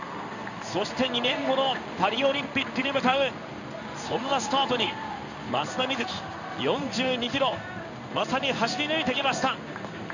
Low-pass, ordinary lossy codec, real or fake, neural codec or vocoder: 7.2 kHz; none; fake; codec, 16 kHz in and 24 kHz out, 1 kbps, XY-Tokenizer